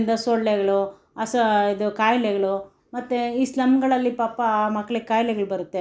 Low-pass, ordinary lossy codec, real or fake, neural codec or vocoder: none; none; real; none